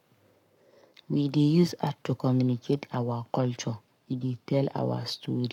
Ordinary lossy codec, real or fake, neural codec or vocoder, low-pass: none; fake; codec, 44.1 kHz, 7.8 kbps, Pupu-Codec; 19.8 kHz